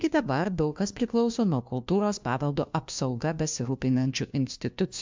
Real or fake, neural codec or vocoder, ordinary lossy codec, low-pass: fake; codec, 16 kHz, 1 kbps, FunCodec, trained on LibriTTS, 50 frames a second; MP3, 64 kbps; 7.2 kHz